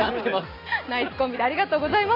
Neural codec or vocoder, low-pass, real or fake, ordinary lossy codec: none; 5.4 kHz; real; AAC, 24 kbps